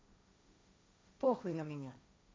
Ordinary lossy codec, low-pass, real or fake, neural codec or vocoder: none; none; fake; codec, 16 kHz, 1.1 kbps, Voila-Tokenizer